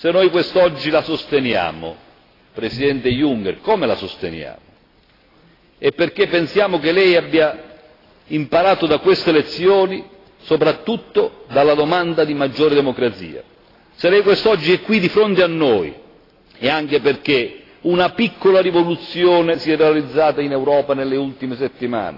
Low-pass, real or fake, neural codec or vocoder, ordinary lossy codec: 5.4 kHz; real; none; AAC, 24 kbps